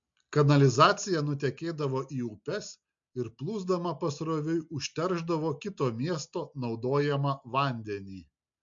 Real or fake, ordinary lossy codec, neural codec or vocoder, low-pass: real; MP3, 48 kbps; none; 7.2 kHz